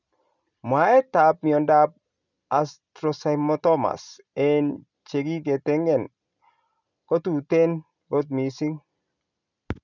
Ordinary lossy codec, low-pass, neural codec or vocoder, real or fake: none; 7.2 kHz; none; real